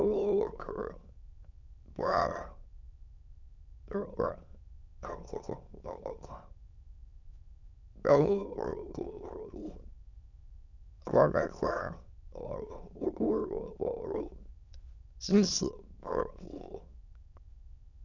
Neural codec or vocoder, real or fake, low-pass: autoencoder, 22.05 kHz, a latent of 192 numbers a frame, VITS, trained on many speakers; fake; 7.2 kHz